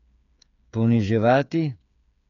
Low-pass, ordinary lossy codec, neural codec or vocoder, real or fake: 7.2 kHz; none; codec, 16 kHz, 8 kbps, FreqCodec, smaller model; fake